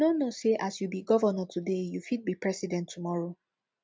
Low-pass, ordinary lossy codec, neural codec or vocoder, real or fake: none; none; none; real